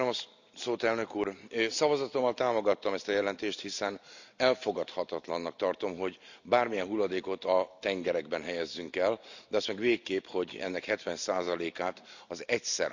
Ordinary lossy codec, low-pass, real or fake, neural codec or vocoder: none; 7.2 kHz; real; none